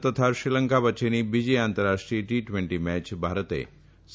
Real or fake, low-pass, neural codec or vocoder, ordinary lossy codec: real; none; none; none